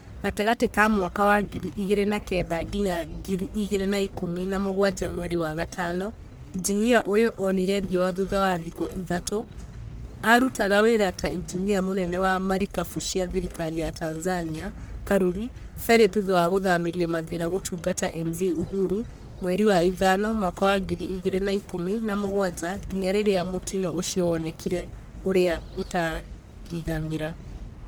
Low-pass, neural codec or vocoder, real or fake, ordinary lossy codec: none; codec, 44.1 kHz, 1.7 kbps, Pupu-Codec; fake; none